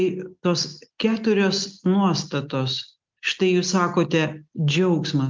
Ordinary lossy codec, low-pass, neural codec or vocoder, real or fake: Opus, 24 kbps; 7.2 kHz; none; real